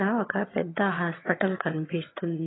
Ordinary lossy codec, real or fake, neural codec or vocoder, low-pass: AAC, 16 kbps; fake; vocoder, 22.05 kHz, 80 mel bands, HiFi-GAN; 7.2 kHz